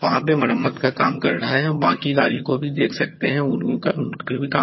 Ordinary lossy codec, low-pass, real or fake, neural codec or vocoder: MP3, 24 kbps; 7.2 kHz; fake; vocoder, 22.05 kHz, 80 mel bands, HiFi-GAN